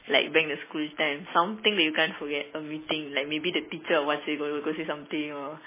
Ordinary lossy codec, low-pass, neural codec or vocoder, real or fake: MP3, 16 kbps; 3.6 kHz; none; real